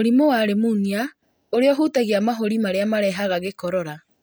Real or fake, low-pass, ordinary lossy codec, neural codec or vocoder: real; none; none; none